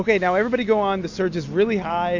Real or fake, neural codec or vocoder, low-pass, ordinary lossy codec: real; none; 7.2 kHz; AAC, 48 kbps